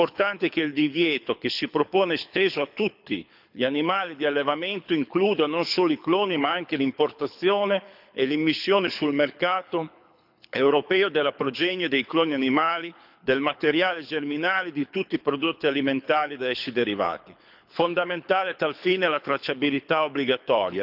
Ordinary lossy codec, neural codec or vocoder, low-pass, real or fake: none; codec, 24 kHz, 6 kbps, HILCodec; 5.4 kHz; fake